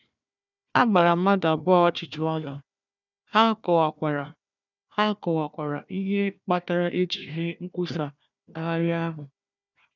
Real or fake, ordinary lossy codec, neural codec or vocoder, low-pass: fake; none; codec, 16 kHz, 1 kbps, FunCodec, trained on Chinese and English, 50 frames a second; 7.2 kHz